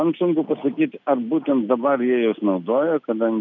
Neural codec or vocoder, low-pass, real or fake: none; 7.2 kHz; real